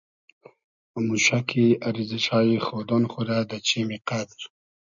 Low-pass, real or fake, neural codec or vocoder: 7.2 kHz; real; none